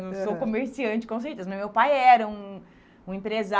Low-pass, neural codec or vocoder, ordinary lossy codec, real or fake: none; none; none; real